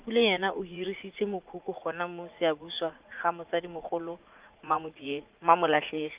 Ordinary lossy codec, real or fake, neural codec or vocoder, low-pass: Opus, 32 kbps; fake; vocoder, 44.1 kHz, 80 mel bands, Vocos; 3.6 kHz